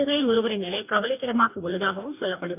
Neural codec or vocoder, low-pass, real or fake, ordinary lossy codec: codec, 44.1 kHz, 2.6 kbps, DAC; 3.6 kHz; fake; none